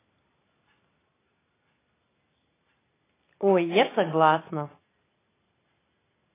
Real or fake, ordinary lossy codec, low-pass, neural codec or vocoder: real; AAC, 16 kbps; 3.6 kHz; none